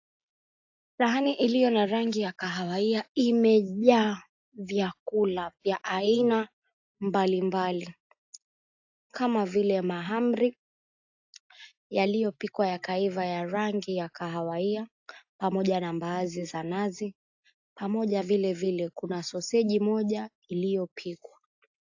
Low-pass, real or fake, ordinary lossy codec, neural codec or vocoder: 7.2 kHz; real; AAC, 48 kbps; none